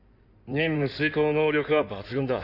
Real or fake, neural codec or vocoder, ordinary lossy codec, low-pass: fake; codec, 16 kHz in and 24 kHz out, 2.2 kbps, FireRedTTS-2 codec; none; 5.4 kHz